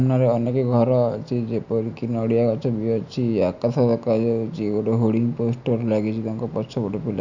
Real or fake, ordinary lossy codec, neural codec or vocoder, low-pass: real; none; none; 7.2 kHz